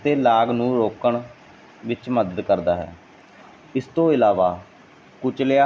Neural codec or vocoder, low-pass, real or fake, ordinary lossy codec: none; none; real; none